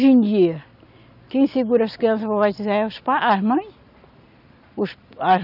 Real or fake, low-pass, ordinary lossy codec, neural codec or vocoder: real; 5.4 kHz; none; none